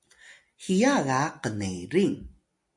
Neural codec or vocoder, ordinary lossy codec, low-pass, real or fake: none; MP3, 48 kbps; 10.8 kHz; real